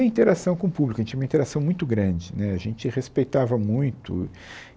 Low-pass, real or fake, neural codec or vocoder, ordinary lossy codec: none; real; none; none